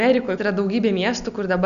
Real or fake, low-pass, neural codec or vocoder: real; 7.2 kHz; none